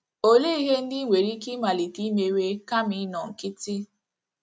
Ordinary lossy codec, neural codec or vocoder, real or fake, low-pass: none; none; real; none